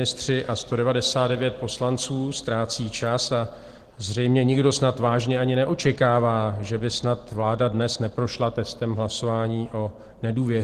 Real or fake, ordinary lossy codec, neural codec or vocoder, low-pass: real; Opus, 16 kbps; none; 14.4 kHz